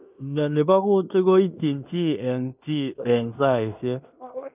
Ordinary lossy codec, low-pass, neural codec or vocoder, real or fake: none; 3.6 kHz; codec, 16 kHz in and 24 kHz out, 0.9 kbps, LongCat-Audio-Codec, fine tuned four codebook decoder; fake